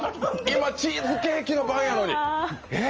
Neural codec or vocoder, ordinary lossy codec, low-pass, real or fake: none; Opus, 24 kbps; 7.2 kHz; real